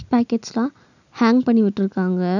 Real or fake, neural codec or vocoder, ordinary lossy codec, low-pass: real; none; none; 7.2 kHz